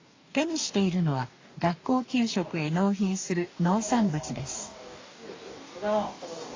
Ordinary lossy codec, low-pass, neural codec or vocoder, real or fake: AAC, 32 kbps; 7.2 kHz; codec, 44.1 kHz, 2.6 kbps, DAC; fake